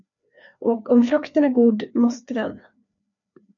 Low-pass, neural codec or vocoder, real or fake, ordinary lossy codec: 7.2 kHz; codec, 16 kHz, 2 kbps, FreqCodec, larger model; fake; AAC, 64 kbps